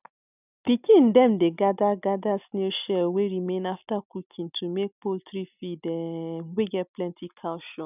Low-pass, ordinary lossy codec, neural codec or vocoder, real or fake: 3.6 kHz; none; none; real